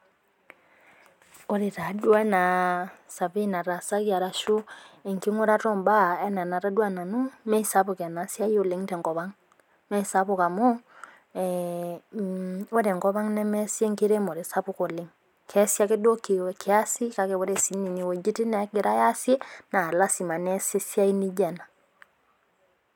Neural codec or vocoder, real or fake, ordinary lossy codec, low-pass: none; real; none; 19.8 kHz